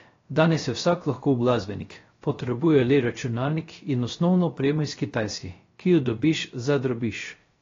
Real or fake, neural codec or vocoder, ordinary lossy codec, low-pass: fake; codec, 16 kHz, 0.3 kbps, FocalCodec; AAC, 32 kbps; 7.2 kHz